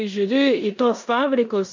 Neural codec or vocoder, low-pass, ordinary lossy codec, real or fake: codec, 16 kHz in and 24 kHz out, 0.9 kbps, LongCat-Audio-Codec, four codebook decoder; 7.2 kHz; MP3, 64 kbps; fake